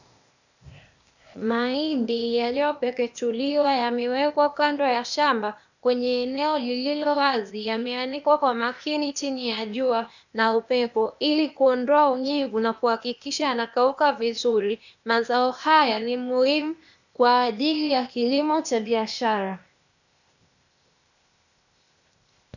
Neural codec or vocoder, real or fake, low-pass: codec, 16 kHz, 0.8 kbps, ZipCodec; fake; 7.2 kHz